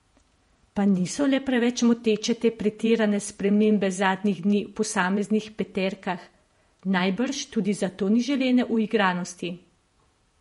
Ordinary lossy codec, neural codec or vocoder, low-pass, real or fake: MP3, 48 kbps; vocoder, 48 kHz, 128 mel bands, Vocos; 19.8 kHz; fake